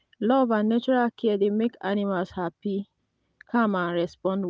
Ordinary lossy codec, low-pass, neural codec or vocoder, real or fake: none; none; none; real